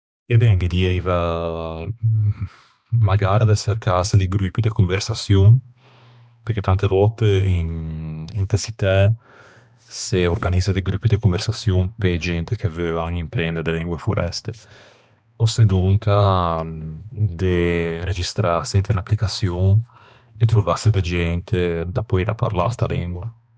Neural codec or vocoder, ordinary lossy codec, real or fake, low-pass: codec, 16 kHz, 2 kbps, X-Codec, HuBERT features, trained on balanced general audio; none; fake; none